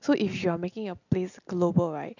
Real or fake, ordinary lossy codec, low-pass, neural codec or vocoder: real; none; 7.2 kHz; none